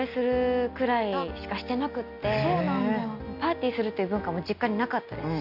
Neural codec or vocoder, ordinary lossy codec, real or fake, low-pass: none; none; real; 5.4 kHz